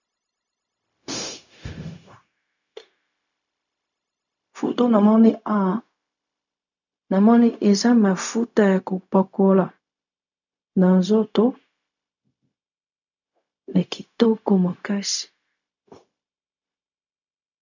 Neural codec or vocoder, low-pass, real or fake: codec, 16 kHz, 0.4 kbps, LongCat-Audio-Codec; 7.2 kHz; fake